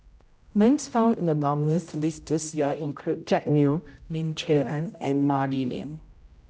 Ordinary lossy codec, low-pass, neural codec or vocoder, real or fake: none; none; codec, 16 kHz, 0.5 kbps, X-Codec, HuBERT features, trained on general audio; fake